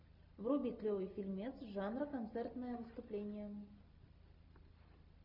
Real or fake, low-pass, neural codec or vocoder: real; 5.4 kHz; none